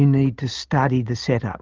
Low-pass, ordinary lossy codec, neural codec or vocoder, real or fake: 7.2 kHz; Opus, 32 kbps; none; real